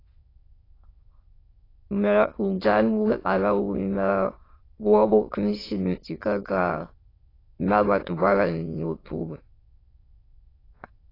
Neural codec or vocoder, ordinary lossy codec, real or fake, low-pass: autoencoder, 22.05 kHz, a latent of 192 numbers a frame, VITS, trained on many speakers; AAC, 24 kbps; fake; 5.4 kHz